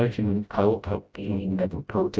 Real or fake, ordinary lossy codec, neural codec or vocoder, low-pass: fake; none; codec, 16 kHz, 0.5 kbps, FreqCodec, smaller model; none